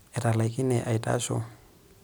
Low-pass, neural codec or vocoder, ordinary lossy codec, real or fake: none; none; none; real